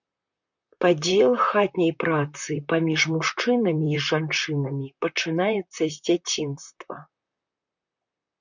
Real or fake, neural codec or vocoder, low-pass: fake; vocoder, 44.1 kHz, 128 mel bands, Pupu-Vocoder; 7.2 kHz